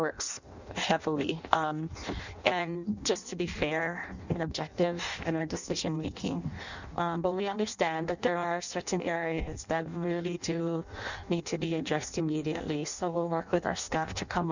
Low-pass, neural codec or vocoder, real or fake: 7.2 kHz; codec, 16 kHz in and 24 kHz out, 0.6 kbps, FireRedTTS-2 codec; fake